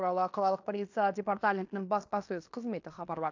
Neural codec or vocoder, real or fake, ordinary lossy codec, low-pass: codec, 16 kHz in and 24 kHz out, 0.9 kbps, LongCat-Audio-Codec, fine tuned four codebook decoder; fake; AAC, 48 kbps; 7.2 kHz